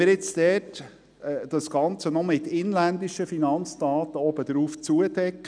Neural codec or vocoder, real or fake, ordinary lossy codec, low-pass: none; real; none; 9.9 kHz